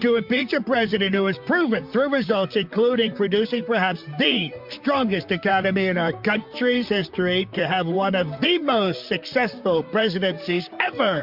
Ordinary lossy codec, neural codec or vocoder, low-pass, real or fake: MP3, 48 kbps; vocoder, 44.1 kHz, 128 mel bands, Pupu-Vocoder; 5.4 kHz; fake